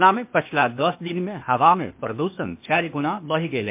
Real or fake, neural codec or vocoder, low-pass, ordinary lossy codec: fake; codec, 16 kHz, 0.8 kbps, ZipCodec; 3.6 kHz; MP3, 32 kbps